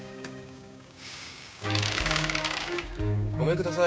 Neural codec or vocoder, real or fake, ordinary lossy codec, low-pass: codec, 16 kHz, 6 kbps, DAC; fake; none; none